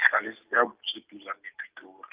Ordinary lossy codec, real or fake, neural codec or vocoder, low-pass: Opus, 24 kbps; real; none; 3.6 kHz